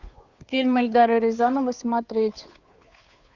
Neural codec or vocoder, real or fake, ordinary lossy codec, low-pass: codec, 16 kHz, 2 kbps, FunCodec, trained on Chinese and English, 25 frames a second; fake; Opus, 64 kbps; 7.2 kHz